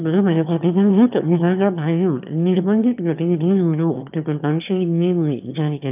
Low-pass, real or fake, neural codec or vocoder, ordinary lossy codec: 3.6 kHz; fake; autoencoder, 22.05 kHz, a latent of 192 numbers a frame, VITS, trained on one speaker; none